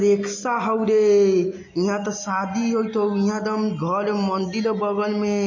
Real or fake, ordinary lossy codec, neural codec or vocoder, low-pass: real; MP3, 32 kbps; none; 7.2 kHz